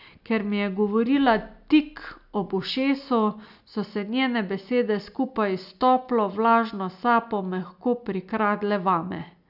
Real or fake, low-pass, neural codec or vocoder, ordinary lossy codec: real; 5.4 kHz; none; none